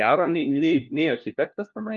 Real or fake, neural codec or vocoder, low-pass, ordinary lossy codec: fake; codec, 16 kHz, 1 kbps, FunCodec, trained on LibriTTS, 50 frames a second; 7.2 kHz; Opus, 32 kbps